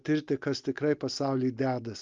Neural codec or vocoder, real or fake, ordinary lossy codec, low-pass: none; real; Opus, 32 kbps; 7.2 kHz